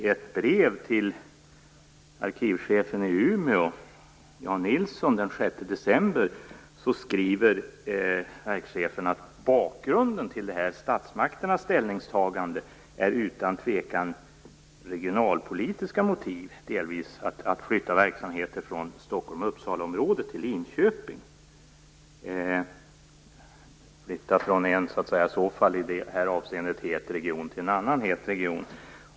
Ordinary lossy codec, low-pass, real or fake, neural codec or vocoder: none; none; real; none